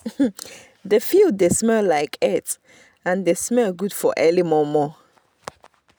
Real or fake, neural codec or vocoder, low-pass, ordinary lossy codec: real; none; none; none